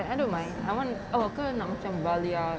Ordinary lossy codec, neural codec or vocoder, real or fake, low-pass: none; none; real; none